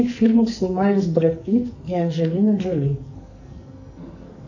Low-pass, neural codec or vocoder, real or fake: 7.2 kHz; codec, 44.1 kHz, 2.6 kbps, SNAC; fake